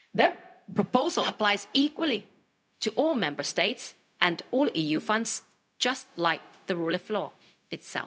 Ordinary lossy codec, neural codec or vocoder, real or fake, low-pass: none; codec, 16 kHz, 0.4 kbps, LongCat-Audio-Codec; fake; none